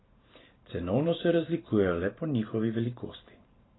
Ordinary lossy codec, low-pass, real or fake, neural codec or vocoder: AAC, 16 kbps; 7.2 kHz; fake; vocoder, 44.1 kHz, 128 mel bands every 512 samples, BigVGAN v2